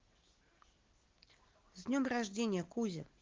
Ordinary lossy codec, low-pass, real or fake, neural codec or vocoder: Opus, 16 kbps; 7.2 kHz; real; none